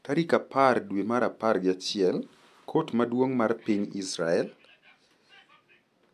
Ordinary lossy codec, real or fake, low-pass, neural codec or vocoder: MP3, 96 kbps; real; 14.4 kHz; none